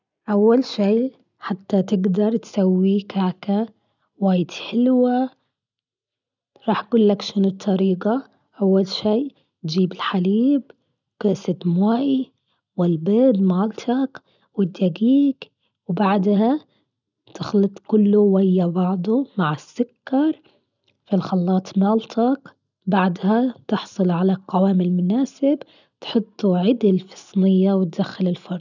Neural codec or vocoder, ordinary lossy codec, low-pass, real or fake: none; none; 7.2 kHz; real